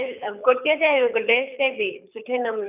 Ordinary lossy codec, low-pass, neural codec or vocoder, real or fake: none; 3.6 kHz; codec, 24 kHz, 6 kbps, HILCodec; fake